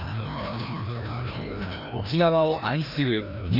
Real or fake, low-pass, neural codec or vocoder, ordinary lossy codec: fake; 5.4 kHz; codec, 16 kHz, 1 kbps, FreqCodec, larger model; none